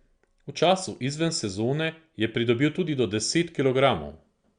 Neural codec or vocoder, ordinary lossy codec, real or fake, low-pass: none; Opus, 64 kbps; real; 9.9 kHz